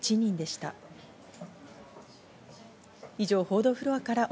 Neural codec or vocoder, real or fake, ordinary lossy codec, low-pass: none; real; none; none